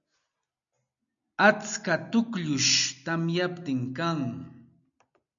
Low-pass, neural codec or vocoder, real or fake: 7.2 kHz; none; real